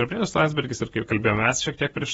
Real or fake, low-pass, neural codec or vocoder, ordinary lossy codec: fake; 19.8 kHz; vocoder, 48 kHz, 128 mel bands, Vocos; AAC, 24 kbps